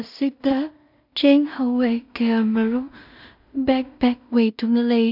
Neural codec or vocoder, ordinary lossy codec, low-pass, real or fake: codec, 16 kHz in and 24 kHz out, 0.4 kbps, LongCat-Audio-Codec, two codebook decoder; none; 5.4 kHz; fake